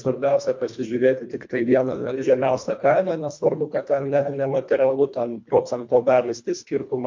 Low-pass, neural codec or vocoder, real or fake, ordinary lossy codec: 7.2 kHz; codec, 24 kHz, 1.5 kbps, HILCodec; fake; MP3, 48 kbps